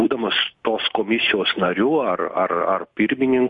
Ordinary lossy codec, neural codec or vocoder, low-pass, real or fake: MP3, 48 kbps; none; 10.8 kHz; real